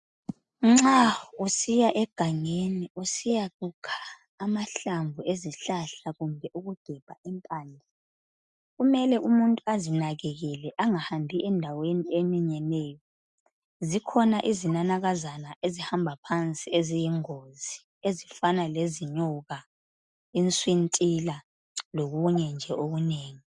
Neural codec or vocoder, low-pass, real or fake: none; 10.8 kHz; real